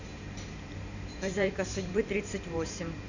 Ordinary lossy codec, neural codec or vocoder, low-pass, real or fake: none; vocoder, 44.1 kHz, 128 mel bands every 512 samples, BigVGAN v2; 7.2 kHz; fake